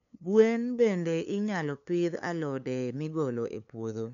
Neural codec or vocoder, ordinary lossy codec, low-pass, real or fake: codec, 16 kHz, 2 kbps, FunCodec, trained on LibriTTS, 25 frames a second; none; 7.2 kHz; fake